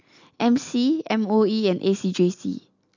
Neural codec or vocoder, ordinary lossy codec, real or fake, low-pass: none; none; real; 7.2 kHz